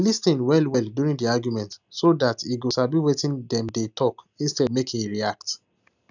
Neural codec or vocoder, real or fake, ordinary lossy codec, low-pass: none; real; none; 7.2 kHz